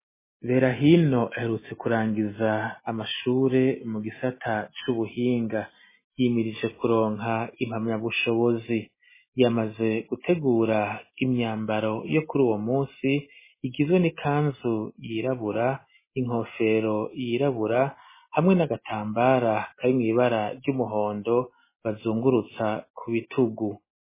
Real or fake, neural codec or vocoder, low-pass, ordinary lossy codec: real; none; 3.6 kHz; MP3, 16 kbps